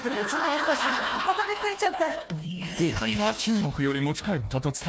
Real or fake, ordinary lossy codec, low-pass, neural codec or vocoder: fake; none; none; codec, 16 kHz, 1 kbps, FunCodec, trained on Chinese and English, 50 frames a second